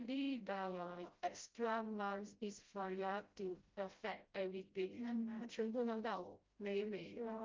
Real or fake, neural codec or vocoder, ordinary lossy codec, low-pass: fake; codec, 16 kHz, 0.5 kbps, FreqCodec, smaller model; Opus, 24 kbps; 7.2 kHz